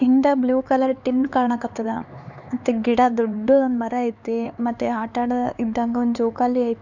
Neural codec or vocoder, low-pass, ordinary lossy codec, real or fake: codec, 16 kHz, 4 kbps, X-Codec, HuBERT features, trained on LibriSpeech; 7.2 kHz; none; fake